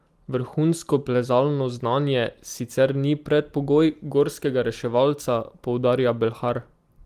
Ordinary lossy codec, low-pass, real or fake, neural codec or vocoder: Opus, 24 kbps; 14.4 kHz; real; none